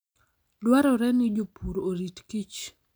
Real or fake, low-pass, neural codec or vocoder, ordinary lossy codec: real; none; none; none